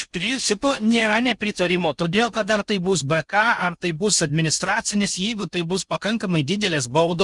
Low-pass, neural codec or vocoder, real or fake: 10.8 kHz; codec, 16 kHz in and 24 kHz out, 0.6 kbps, FocalCodec, streaming, 4096 codes; fake